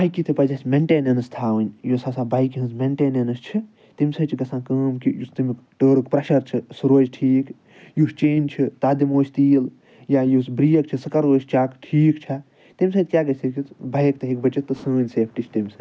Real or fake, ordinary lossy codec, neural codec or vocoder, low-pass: real; none; none; none